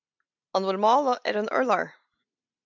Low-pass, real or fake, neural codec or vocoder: 7.2 kHz; real; none